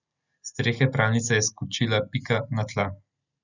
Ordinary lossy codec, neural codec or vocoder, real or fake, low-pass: none; none; real; 7.2 kHz